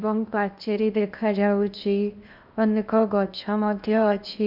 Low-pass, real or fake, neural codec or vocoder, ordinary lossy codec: 5.4 kHz; fake; codec, 16 kHz in and 24 kHz out, 0.8 kbps, FocalCodec, streaming, 65536 codes; none